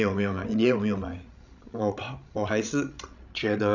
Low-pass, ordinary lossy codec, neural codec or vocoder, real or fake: 7.2 kHz; none; codec, 16 kHz, 16 kbps, FreqCodec, larger model; fake